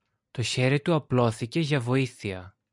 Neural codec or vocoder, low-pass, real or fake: none; 10.8 kHz; real